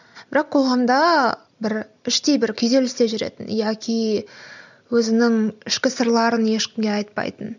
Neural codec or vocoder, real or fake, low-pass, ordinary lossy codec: none; real; 7.2 kHz; none